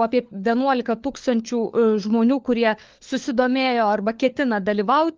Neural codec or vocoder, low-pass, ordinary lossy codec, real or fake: codec, 16 kHz, 4 kbps, FunCodec, trained on LibriTTS, 50 frames a second; 7.2 kHz; Opus, 32 kbps; fake